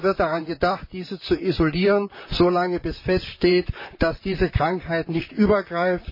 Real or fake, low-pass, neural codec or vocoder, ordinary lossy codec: fake; 5.4 kHz; vocoder, 44.1 kHz, 128 mel bands, Pupu-Vocoder; MP3, 24 kbps